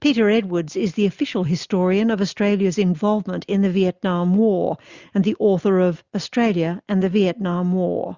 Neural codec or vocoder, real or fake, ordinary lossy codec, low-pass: none; real; Opus, 64 kbps; 7.2 kHz